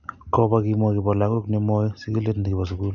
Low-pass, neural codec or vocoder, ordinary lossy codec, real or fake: 7.2 kHz; none; none; real